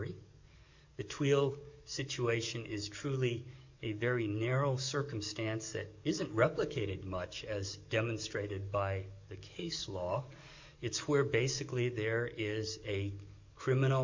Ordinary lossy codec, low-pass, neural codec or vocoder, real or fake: MP3, 64 kbps; 7.2 kHz; codec, 44.1 kHz, 7.8 kbps, DAC; fake